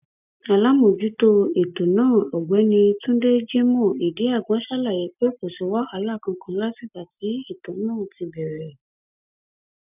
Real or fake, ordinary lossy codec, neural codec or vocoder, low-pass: real; none; none; 3.6 kHz